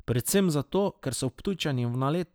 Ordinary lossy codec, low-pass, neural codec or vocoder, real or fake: none; none; none; real